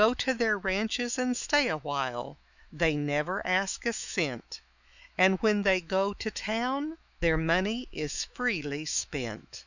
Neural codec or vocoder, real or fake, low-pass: autoencoder, 48 kHz, 128 numbers a frame, DAC-VAE, trained on Japanese speech; fake; 7.2 kHz